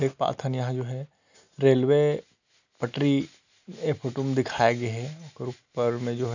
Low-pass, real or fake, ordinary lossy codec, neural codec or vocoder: 7.2 kHz; real; none; none